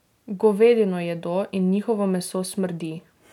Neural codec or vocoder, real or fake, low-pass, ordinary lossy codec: none; real; 19.8 kHz; none